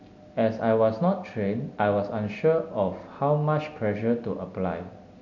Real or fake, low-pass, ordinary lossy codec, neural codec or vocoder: real; 7.2 kHz; none; none